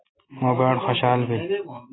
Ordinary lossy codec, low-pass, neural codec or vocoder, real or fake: AAC, 16 kbps; 7.2 kHz; none; real